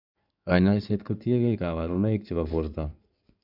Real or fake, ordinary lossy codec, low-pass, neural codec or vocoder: fake; none; 5.4 kHz; codec, 16 kHz in and 24 kHz out, 2.2 kbps, FireRedTTS-2 codec